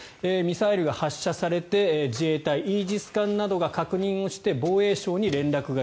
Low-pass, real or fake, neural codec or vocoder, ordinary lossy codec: none; real; none; none